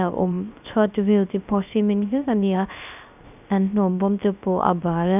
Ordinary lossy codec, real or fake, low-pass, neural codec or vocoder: none; fake; 3.6 kHz; codec, 16 kHz, 0.3 kbps, FocalCodec